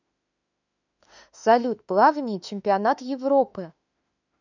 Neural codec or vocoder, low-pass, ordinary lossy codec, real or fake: autoencoder, 48 kHz, 32 numbers a frame, DAC-VAE, trained on Japanese speech; 7.2 kHz; none; fake